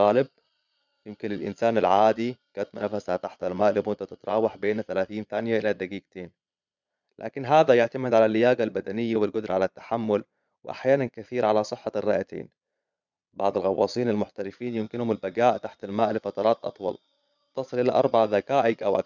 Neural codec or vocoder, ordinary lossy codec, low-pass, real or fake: vocoder, 22.05 kHz, 80 mel bands, Vocos; none; 7.2 kHz; fake